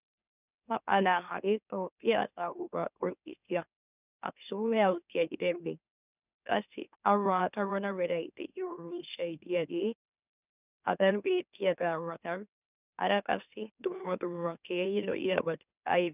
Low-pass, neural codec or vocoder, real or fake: 3.6 kHz; autoencoder, 44.1 kHz, a latent of 192 numbers a frame, MeloTTS; fake